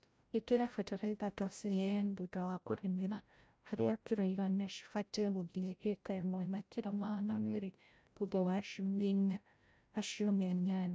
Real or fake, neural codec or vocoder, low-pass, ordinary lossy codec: fake; codec, 16 kHz, 0.5 kbps, FreqCodec, larger model; none; none